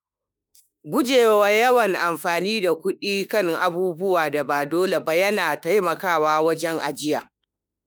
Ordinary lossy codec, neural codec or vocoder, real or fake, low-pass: none; autoencoder, 48 kHz, 32 numbers a frame, DAC-VAE, trained on Japanese speech; fake; none